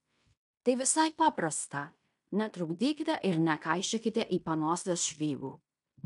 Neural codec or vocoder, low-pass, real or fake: codec, 16 kHz in and 24 kHz out, 0.9 kbps, LongCat-Audio-Codec, fine tuned four codebook decoder; 10.8 kHz; fake